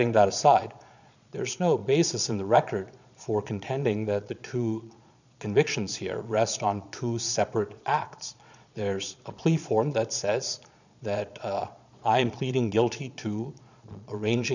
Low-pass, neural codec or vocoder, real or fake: 7.2 kHz; vocoder, 22.05 kHz, 80 mel bands, Vocos; fake